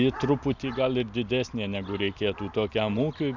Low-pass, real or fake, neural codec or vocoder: 7.2 kHz; real; none